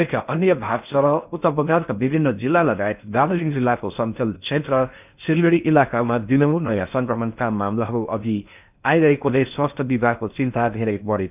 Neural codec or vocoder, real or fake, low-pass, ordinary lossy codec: codec, 16 kHz in and 24 kHz out, 0.6 kbps, FocalCodec, streaming, 4096 codes; fake; 3.6 kHz; none